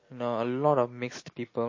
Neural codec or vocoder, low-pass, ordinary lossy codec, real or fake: none; 7.2 kHz; MP3, 32 kbps; real